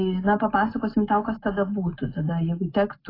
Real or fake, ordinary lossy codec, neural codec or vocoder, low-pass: real; AAC, 24 kbps; none; 5.4 kHz